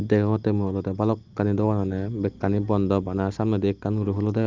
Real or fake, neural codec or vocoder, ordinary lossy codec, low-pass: real; none; Opus, 32 kbps; 7.2 kHz